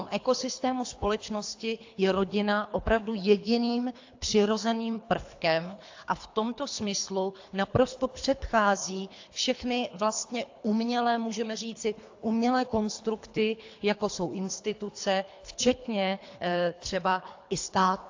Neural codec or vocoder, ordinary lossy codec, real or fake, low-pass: codec, 24 kHz, 3 kbps, HILCodec; AAC, 48 kbps; fake; 7.2 kHz